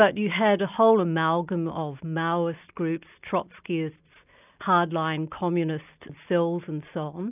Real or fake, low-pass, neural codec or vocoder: real; 3.6 kHz; none